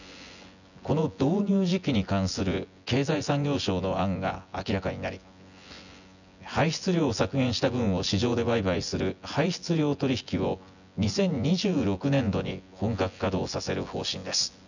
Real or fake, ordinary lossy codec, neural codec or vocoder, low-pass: fake; none; vocoder, 24 kHz, 100 mel bands, Vocos; 7.2 kHz